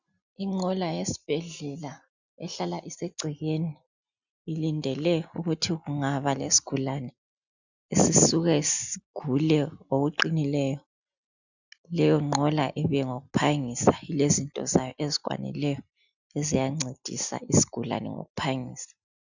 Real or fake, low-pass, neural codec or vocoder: real; 7.2 kHz; none